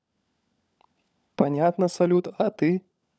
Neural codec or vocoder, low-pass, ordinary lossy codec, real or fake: codec, 16 kHz, 16 kbps, FunCodec, trained on LibriTTS, 50 frames a second; none; none; fake